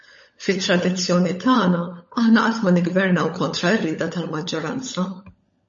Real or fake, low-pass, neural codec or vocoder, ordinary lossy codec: fake; 7.2 kHz; codec, 16 kHz, 16 kbps, FunCodec, trained on LibriTTS, 50 frames a second; MP3, 32 kbps